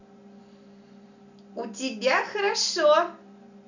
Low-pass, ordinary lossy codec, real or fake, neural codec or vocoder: 7.2 kHz; none; real; none